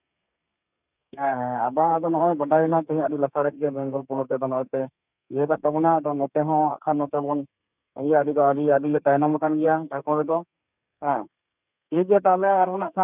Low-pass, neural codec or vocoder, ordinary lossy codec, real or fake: 3.6 kHz; codec, 32 kHz, 1.9 kbps, SNAC; none; fake